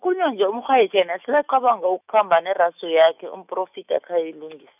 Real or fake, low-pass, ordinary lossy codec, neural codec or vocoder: fake; 3.6 kHz; none; autoencoder, 48 kHz, 128 numbers a frame, DAC-VAE, trained on Japanese speech